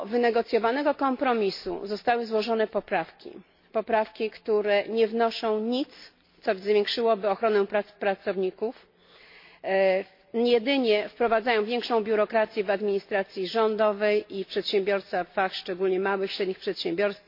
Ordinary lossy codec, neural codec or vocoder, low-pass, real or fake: MP3, 32 kbps; none; 5.4 kHz; real